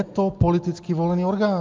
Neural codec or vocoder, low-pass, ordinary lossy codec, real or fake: none; 7.2 kHz; Opus, 32 kbps; real